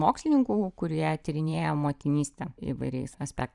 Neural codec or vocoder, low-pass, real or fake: vocoder, 44.1 kHz, 128 mel bands every 512 samples, BigVGAN v2; 10.8 kHz; fake